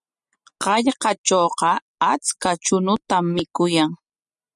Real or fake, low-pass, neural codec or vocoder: real; 10.8 kHz; none